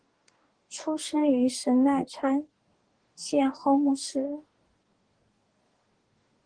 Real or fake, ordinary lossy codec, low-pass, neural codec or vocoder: fake; Opus, 16 kbps; 9.9 kHz; codec, 44.1 kHz, 2.6 kbps, DAC